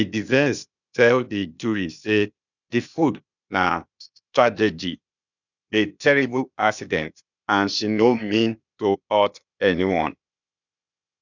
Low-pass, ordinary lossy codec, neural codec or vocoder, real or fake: 7.2 kHz; none; codec, 16 kHz, 0.8 kbps, ZipCodec; fake